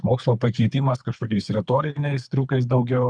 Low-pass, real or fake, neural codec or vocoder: 9.9 kHz; fake; codec, 24 kHz, 3 kbps, HILCodec